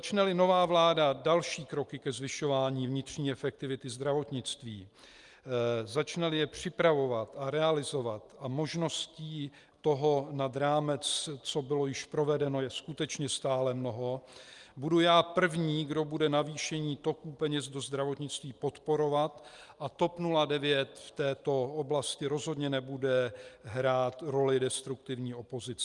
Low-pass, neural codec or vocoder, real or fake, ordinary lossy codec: 10.8 kHz; none; real; Opus, 32 kbps